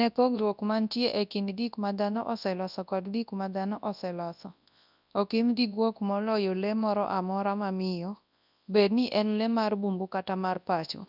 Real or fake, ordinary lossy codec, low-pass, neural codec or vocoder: fake; none; 5.4 kHz; codec, 24 kHz, 0.9 kbps, WavTokenizer, large speech release